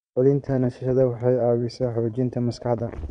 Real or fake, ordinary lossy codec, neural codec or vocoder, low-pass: fake; none; vocoder, 22.05 kHz, 80 mel bands, Vocos; 9.9 kHz